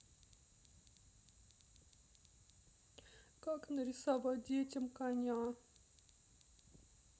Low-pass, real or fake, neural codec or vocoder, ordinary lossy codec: none; real; none; none